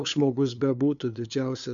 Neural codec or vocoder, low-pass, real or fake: codec, 16 kHz, 2 kbps, FunCodec, trained on LibriTTS, 25 frames a second; 7.2 kHz; fake